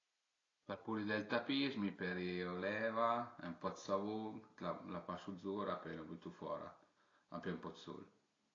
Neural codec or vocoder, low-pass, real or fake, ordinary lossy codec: none; 7.2 kHz; real; AAC, 32 kbps